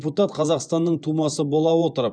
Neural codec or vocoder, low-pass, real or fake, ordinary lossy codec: vocoder, 24 kHz, 100 mel bands, Vocos; 9.9 kHz; fake; none